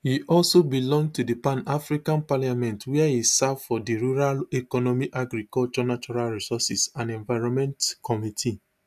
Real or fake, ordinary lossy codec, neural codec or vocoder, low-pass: real; AAC, 96 kbps; none; 14.4 kHz